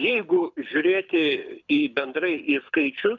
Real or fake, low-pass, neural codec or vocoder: fake; 7.2 kHz; codec, 24 kHz, 6 kbps, HILCodec